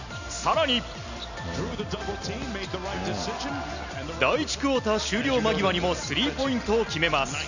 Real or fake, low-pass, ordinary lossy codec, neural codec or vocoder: real; 7.2 kHz; none; none